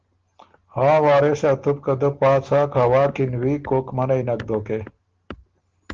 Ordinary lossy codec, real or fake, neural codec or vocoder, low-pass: Opus, 16 kbps; real; none; 7.2 kHz